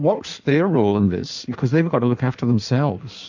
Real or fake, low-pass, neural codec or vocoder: fake; 7.2 kHz; codec, 16 kHz in and 24 kHz out, 1.1 kbps, FireRedTTS-2 codec